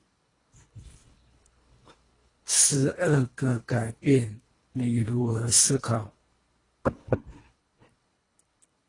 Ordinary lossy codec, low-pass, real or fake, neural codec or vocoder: AAC, 32 kbps; 10.8 kHz; fake; codec, 24 kHz, 1.5 kbps, HILCodec